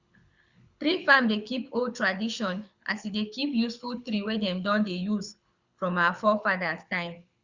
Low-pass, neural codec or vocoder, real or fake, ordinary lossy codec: 7.2 kHz; codec, 24 kHz, 6 kbps, HILCodec; fake; Opus, 64 kbps